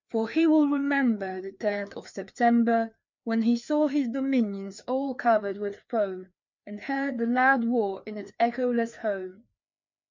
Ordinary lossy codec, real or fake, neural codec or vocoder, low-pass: MP3, 64 kbps; fake; codec, 16 kHz, 2 kbps, FreqCodec, larger model; 7.2 kHz